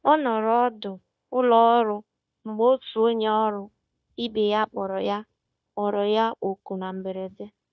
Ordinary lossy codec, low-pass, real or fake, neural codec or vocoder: none; none; fake; codec, 16 kHz, 0.9 kbps, LongCat-Audio-Codec